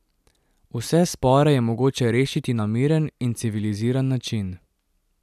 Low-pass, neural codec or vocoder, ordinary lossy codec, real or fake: 14.4 kHz; none; none; real